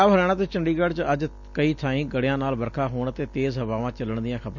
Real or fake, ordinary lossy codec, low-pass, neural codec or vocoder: real; none; 7.2 kHz; none